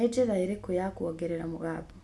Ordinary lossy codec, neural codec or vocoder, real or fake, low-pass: none; none; real; none